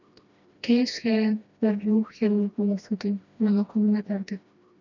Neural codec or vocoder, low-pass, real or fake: codec, 16 kHz, 1 kbps, FreqCodec, smaller model; 7.2 kHz; fake